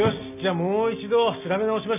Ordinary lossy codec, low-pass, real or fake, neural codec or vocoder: none; 3.6 kHz; real; none